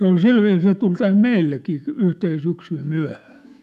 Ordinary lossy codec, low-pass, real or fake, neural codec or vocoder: none; 14.4 kHz; fake; autoencoder, 48 kHz, 128 numbers a frame, DAC-VAE, trained on Japanese speech